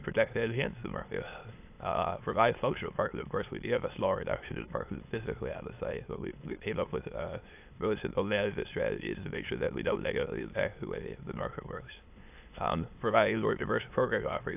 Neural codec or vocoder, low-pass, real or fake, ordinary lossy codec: autoencoder, 22.05 kHz, a latent of 192 numbers a frame, VITS, trained on many speakers; 3.6 kHz; fake; AAC, 32 kbps